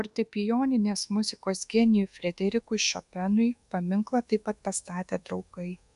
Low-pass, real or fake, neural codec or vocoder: 10.8 kHz; fake; codec, 24 kHz, 1.2 kbps, DualCodec